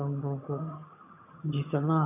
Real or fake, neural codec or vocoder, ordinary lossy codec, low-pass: fake; vocoder, 22.05 kHz, 80 mel bands, HiFi-GAN; none; 3.6 kHz